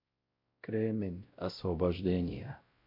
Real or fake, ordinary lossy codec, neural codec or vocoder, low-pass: fake; MP3, 32 kbps; codec, 16 kHz, 0.5 kbps, X-Codec, WavLM features, trained on Multilingual LibriSpeech; 5.4 kHz